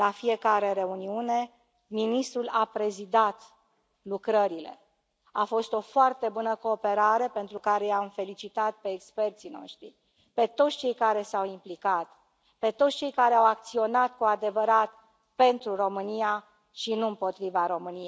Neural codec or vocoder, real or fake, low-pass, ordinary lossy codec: none; real; none; none